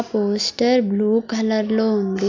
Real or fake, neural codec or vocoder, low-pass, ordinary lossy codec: real; none; 7.2 kHz; AAC, 48 kbps